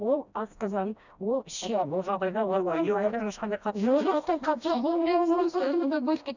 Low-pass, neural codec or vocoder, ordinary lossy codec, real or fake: 7.2 kHz; codec, 16 kHz, 1 kbps, FreqCodec, smaller model; none; fake